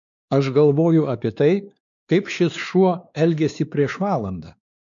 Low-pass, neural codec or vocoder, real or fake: 7.2 kHz; codec, 16 kHz, 2 kbps, X-Codec, WavLM features, trained on Multilingual LibriSpeech; fake